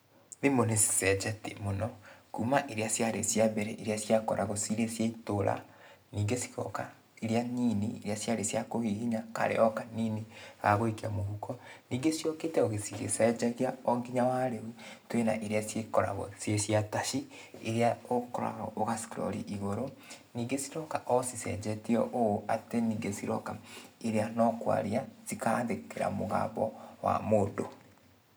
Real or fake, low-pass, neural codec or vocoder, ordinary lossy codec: real; none; none; none